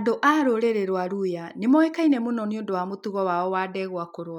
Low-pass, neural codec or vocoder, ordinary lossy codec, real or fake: 19.8 kHz; none; none; real